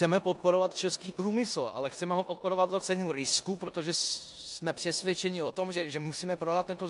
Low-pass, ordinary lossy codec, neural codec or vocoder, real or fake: 10.8 kHz; AAC, 64 kbps; codec, 16 kHz in and 24 kHz out, 0.9 kbps, LongCat-Audio-Codec, four codebook decoder; fake